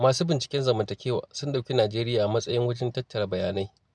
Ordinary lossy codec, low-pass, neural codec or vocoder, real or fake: none; none; none; real